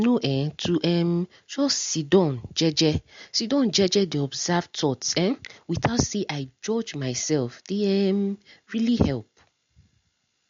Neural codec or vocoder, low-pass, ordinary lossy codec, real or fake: none; 7.2 kHz; MP3, 48 kbps; real